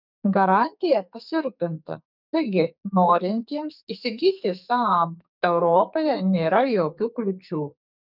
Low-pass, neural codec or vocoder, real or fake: 5.4 kHz; codec, 44.1 kHz, 2.6 kbps, SNAC; fake